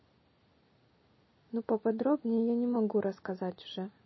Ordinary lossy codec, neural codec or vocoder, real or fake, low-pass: MP3, 24 kbps; none; real; 7.2 kHz